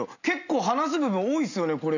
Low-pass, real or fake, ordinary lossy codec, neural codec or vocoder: 7.2 kHz; real; none; none